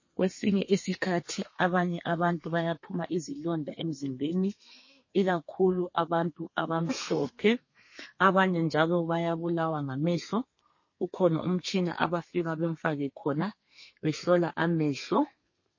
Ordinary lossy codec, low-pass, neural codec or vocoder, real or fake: MP3, 32 kbps; 7.2 kHz; codec, 32 kHz, 1.9 kbps, SNAC; fake